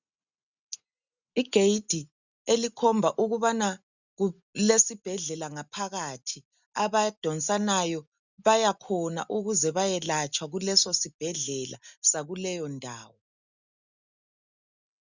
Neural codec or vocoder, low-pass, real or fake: none; 7.2 kHz; real